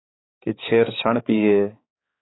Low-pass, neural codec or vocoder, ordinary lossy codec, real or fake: 7.2 kHz; codec, 16 kHz, 4 kbps, X-Codec, HuBERT features, trained on balanced general audio; AAC, 16 kbps; fake